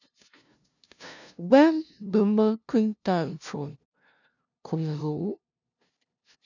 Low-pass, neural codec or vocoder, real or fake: 7.2 kHz; codec, 16 kHz, 0.5 kbps, FunCodec, trained on LibriTTS, 25 frames a second; fake